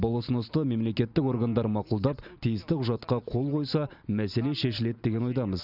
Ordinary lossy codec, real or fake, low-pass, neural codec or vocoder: none; real; 5.4 kHz; none